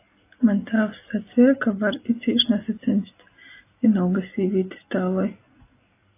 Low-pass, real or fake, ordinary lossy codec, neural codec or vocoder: 3.6 kHz; real; AAC, 24 kbps; none